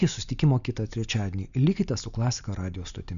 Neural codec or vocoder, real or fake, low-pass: none; real; 7.2 kHz